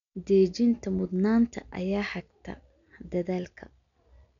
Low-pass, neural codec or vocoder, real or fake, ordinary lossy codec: 7.2 kHz; none; real; none